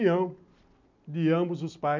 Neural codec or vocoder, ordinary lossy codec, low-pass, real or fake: none; MP3, 64 kbps; 7.2 kHz; real